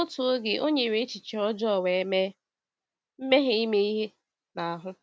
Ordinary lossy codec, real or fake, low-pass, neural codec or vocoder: none; real; none; none